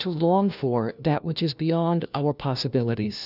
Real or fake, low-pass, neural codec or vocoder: fake; 5.4 kHz; codec, 16 kHz, 1 kbps, FunCodec, trained on LibriTTS, 50 frames a second